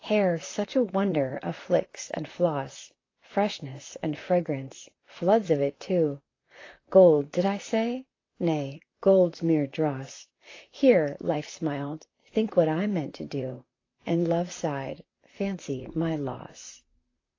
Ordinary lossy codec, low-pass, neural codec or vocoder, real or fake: AAC, 32 kbps; 7.2 kHz; vocoder, 44.1 kHz, 128 mel bands, Pupu-Vocoder; fake